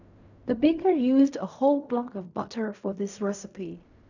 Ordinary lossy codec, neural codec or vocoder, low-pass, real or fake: none; codec, 16 kHz in and 24 kHz out, 0.4 kbps, LongCat-Audio-Codec, fine tuned four codebook decoder; 7.2 kHz; fake